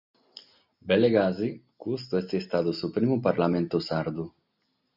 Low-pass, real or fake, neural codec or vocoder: 5.4 kHz; real; none